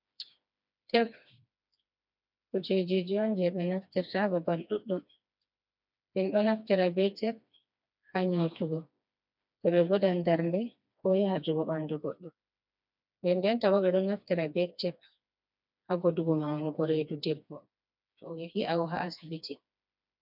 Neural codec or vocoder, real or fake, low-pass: codec, 16 kHz, 2 kbps, FreqCodec, smaller model; fake; 5.4 kHz